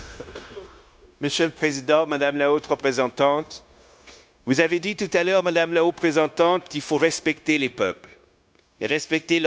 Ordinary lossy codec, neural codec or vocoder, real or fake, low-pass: none; codec, 16 kHz, 0.9 kbps, LongCat-Audio-Codec; fake; none